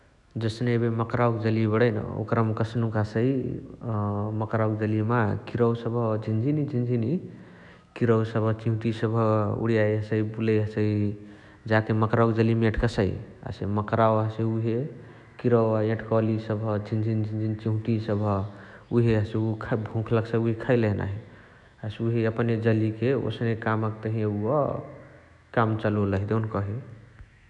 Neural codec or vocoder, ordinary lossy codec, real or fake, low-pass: autoencoder, 48 kHz, 128 numbers a frame, DAC-VAE, trained on Japanese speech; none; fake; 10.8 kHz